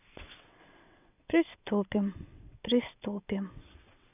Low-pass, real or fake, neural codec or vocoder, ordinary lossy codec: 3.6 kHz; fake; vocoder, 22.05 kHz, 80 mel bands, WaveNeXt; none